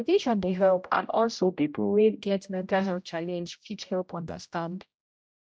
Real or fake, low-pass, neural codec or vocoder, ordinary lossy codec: fake; none; codec, 16 kHz, 0.5 kbps, X-Codec, HuBERT features, trained on general audio; none